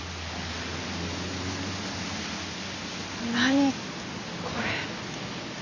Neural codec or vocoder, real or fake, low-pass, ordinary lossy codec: none; real; 7.2 kHz; none